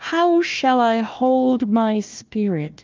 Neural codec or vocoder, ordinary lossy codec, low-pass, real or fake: codec, 16 kHz, 1 kbps, FunCodec, trained on Chinese and English, 50 frames a second; Opus, 24 kbps; 7.2 kHz; fake